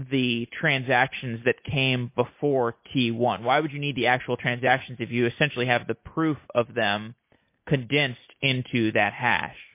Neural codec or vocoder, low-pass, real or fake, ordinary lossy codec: none; 3.6 kHz; real; MP3, 24 kbps